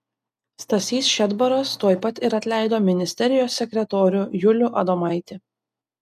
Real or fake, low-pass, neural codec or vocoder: real; 14.4 kHz; none